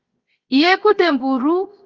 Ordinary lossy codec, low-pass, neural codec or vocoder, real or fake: Opus, 32 kbps; 7.2 kHz; codec, 16 kHz, 0.7 kbps, FocalCodec; fake